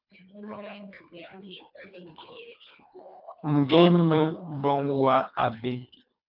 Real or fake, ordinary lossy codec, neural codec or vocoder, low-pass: fake; MP3, 48 kbps; codec, 24 kHz, 1.5 kbps, HILCodec; 5.4 kHz